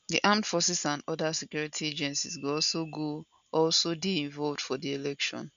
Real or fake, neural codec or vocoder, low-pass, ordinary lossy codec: real; none; 7.2 kHz; MP3, 96 kbps